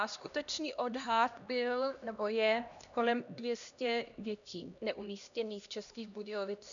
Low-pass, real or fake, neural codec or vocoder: 7.2 kHz; fake; codec, 16 kHz, 1 kbps, X-Codec, HuBERT features, trained on LibriSpeech